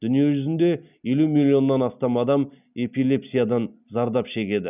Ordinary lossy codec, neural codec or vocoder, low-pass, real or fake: none; none; 3.6 kHz; real